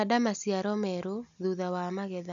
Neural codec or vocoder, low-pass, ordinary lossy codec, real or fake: none; 7.2 kHz; none; real